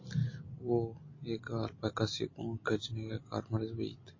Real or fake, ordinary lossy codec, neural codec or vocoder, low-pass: real; MP3, 32 kbps; none; 7.2 kHz